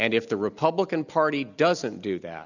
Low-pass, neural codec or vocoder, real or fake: 7.2 kHz; none; real